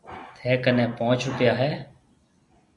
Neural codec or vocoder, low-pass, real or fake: none; 10.8 kHz; real